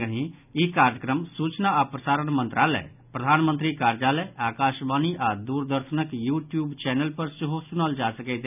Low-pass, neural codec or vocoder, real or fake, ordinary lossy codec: 3.6 kHz; none; real; none